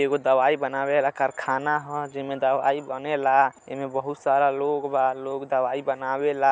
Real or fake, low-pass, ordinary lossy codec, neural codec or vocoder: real; none; none; none